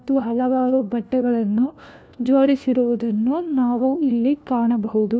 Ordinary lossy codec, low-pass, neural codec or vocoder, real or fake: none; none; codec, 16 kHz, 1 kbps, FunCodec, trained on LibriTTS, 50 frames a second; fake